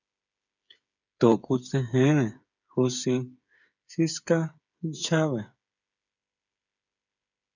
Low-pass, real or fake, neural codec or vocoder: 7.2 kHz; fake; codec, 16 kHz, 8 kbps, FreqCodec, smaller model